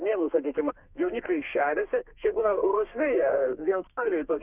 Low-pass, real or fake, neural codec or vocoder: 3.6 kHz; fake; codec, 32 kHz, 1.9 kbps, SNAC